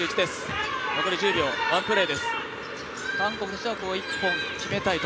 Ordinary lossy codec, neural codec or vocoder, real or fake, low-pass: none; none; real; none